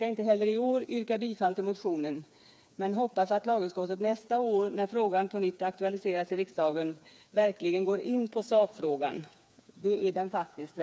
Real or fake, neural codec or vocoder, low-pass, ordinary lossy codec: fake; codec, 16 kHz, 4 kbps, FreqCodec, smaller model; none; none